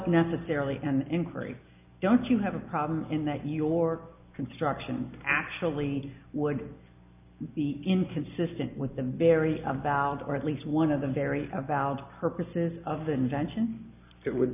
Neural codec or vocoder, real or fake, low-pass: none; real; 3.6 kHz